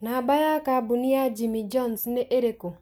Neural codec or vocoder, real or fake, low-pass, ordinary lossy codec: none; real; none; none